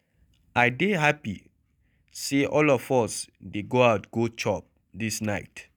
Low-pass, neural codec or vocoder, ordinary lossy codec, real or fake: none; none; none; real